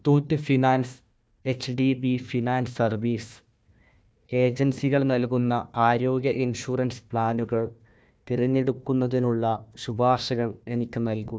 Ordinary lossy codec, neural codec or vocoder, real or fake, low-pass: none; codec, 16 kHz, 1 kbps, FunCodec, trained on Chinese and English, 50 frames a second; fake; none